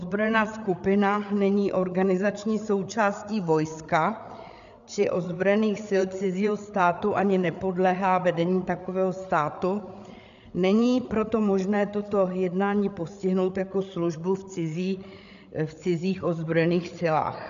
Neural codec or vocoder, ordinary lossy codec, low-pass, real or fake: codec, 16 kHz, 8 kbps, FreqCodec, larger model; MP3, 96 kbps; 7.2 kHz; fake